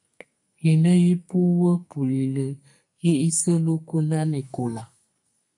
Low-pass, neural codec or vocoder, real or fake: 10.8 kHz; codec, 44.1 kHz, 2.6 kbps, SNAC; fake